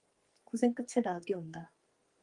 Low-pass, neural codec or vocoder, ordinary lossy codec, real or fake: 10.8 kHz; codec, 44.1 kHz, 2.6 kbps, SNAC; Opus, 24 kbps; fake